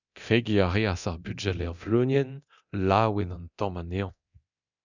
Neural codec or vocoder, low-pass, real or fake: codec, 24 kHz, 0.9 kbps, DualCodec; 7.2 kHz; fake